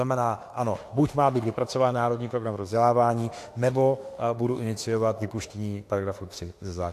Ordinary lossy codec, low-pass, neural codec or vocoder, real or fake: AAC, 64 kbps; 14.4 kHz; autoencoder, 48 kHz, 32 numbers a frame, DAC-VAE, trained on Japanese speech; fake